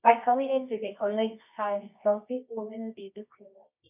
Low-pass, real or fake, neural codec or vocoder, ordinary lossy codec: 3.6 kHz; fake; codec, 24 kHz, 0.9 kbps, WavTokenizer, medium music audio release; none